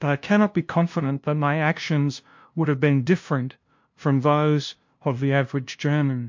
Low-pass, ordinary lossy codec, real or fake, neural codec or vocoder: 7.2 kHz; MP3, 48 kbps; fake; codec, 16 kHz, 0.5 kbps, FunCodec, trained on LibriTTS, 25 frames a second